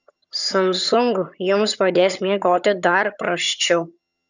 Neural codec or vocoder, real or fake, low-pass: vocoder, 22.05 kHz, 80 mel bands, HiFi-GAN; fake; 7.2 kHz